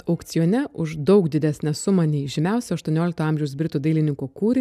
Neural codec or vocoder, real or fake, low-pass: none; real; 14.4 kHz